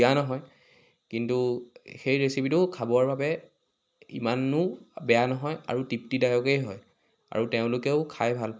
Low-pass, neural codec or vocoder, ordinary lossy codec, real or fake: none; none; none; real